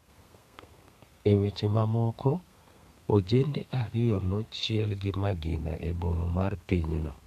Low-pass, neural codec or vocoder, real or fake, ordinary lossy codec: 14.4 kHz; codec, 32 kHz, 1.9 kbps, SNAC; fake; none